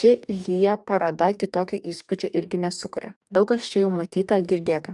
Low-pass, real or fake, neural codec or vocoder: 10.8 kHz; fake; codec, 44.1 kHz, 2.6 kbps, DAC